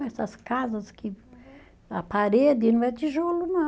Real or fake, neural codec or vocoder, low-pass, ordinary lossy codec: real; none; none; none